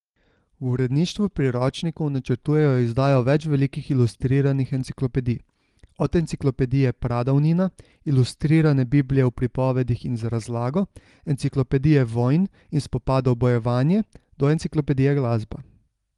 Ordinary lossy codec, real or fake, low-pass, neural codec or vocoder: Opus, 24 kbps; real; 10.8 kHz; none